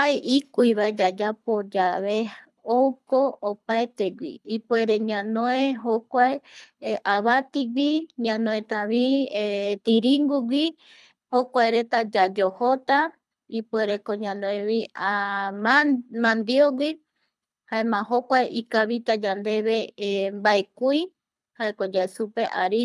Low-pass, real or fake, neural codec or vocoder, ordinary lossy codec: none; fake; codec, 24 kHz, 3 kbps, HILCodec; none